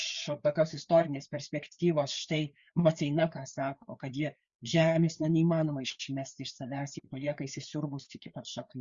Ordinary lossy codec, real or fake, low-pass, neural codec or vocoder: Opus, 64 kbps; fake; 7.2 kHz; codec, 16 kHz, 4 kbps, FreqCodec, larger model